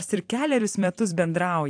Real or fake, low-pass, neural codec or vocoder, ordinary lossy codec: fake; 9.9 kHz; vocoder, 44.1 kHz, 128 mel bands every 256 samples, BigVGAN v2; AAC, 64 kbps